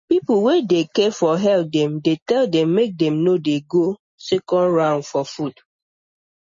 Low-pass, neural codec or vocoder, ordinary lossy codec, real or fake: 7.2 kHz; none; MP3, 32 kbps; real